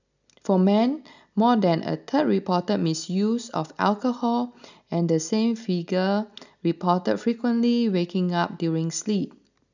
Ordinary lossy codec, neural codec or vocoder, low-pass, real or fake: none; none; 7.2 kHz; real